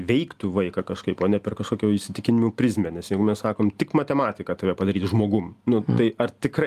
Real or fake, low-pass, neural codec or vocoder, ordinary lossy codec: real; 14.4 kHz; none; Opus, 32 kbps